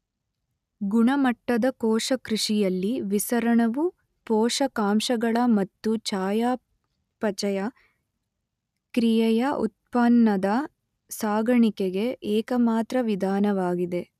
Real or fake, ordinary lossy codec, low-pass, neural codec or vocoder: real; none; 14.4 kHz; none